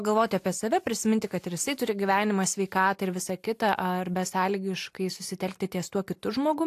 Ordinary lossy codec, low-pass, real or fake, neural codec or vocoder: AAC, 64 kbps; 14.4 kHz; real; none